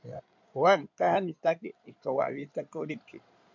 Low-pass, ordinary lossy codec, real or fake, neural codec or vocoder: 7.2 kHz; MP3, 64 kbps; fake; codec, 16 kHz in and 24 kHz out, 2.2 kbps, FireRedTTS-2 codec